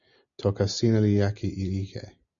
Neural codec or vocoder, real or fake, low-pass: none; real; 7.2 kHz